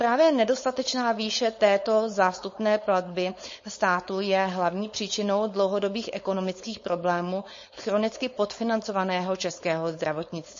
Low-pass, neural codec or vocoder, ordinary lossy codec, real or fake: 7.2 kHz; codec, 16 kHz, 4.8 kbps, FACodec; MP3, 32 kbps; fake